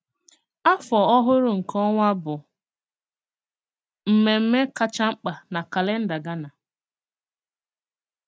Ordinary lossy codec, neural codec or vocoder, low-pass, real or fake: none; none; none; real